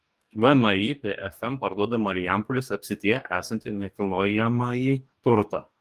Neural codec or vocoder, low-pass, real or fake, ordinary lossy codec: codec, 44.1 kHz, 2.6 kbps, DAC; 14.4 kHz; fake; Opus, 32 kbps